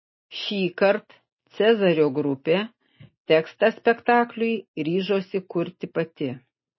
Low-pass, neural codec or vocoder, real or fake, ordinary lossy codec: 7.2 kHz; none; real; MP3, 24 kbps